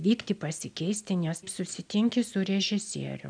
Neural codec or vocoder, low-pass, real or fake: none; 9.9 kHz; real